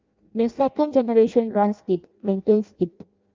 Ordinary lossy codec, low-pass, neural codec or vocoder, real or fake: Opus, 24 kbps; 7.2 kHz; codec, 16 kHz in and 24 kHz out, 0.6 kbps, FireRedTTS-2 codec; fake